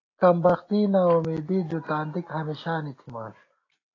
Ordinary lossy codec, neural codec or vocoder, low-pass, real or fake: AAC, 32 kbps; none; 7.2 kHz; real